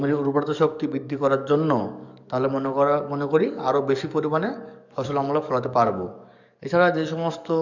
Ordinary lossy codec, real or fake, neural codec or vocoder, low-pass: none; fake; codec, 44.1 kHz, 7.8 kbps, DAC; 7.2 kHz